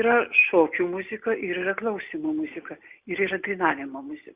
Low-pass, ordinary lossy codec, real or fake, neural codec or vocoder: 3.6 kHz; Opus, 64 kbps; real; none